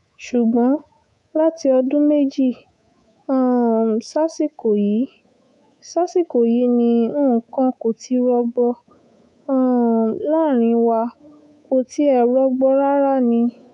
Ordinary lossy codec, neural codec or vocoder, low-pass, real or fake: none; codec, 24 kHz, 3.1 kbps, DualCodec; 10.8 kHz; fake